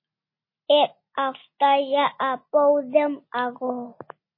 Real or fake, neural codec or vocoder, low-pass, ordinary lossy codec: real; none; 5.4 kHz; MP3, 24 kbps